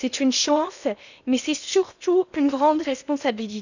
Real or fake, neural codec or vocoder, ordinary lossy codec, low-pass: fake; codec, 16 kHz in and 24 kHz out, 0.8 kbps, FocalCodec, streaming, 65536 codes; none; 7.2 kHz